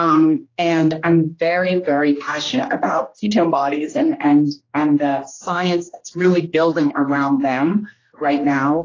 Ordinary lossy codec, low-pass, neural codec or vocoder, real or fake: AAC, 32 kbps; 7.2 kHz; codec, 16 kHz, 1 kbps, X-Codec, HuBERT features, trained on general audio; fake